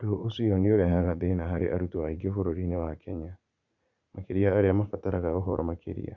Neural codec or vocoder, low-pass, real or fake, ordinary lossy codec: vocoder, 44.1 kHz, 80 mel bands, Vocos; 7.2 kHz; fake; Opus, 64 kbps